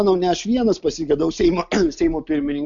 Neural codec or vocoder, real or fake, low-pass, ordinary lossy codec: none; real; 7.2 kHz; MP3, 64 kbps